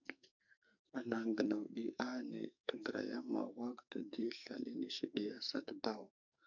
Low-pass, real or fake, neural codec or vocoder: 7.2 kHz; fake; codec, 44.1 kHz, 2.6 kbps, SNAC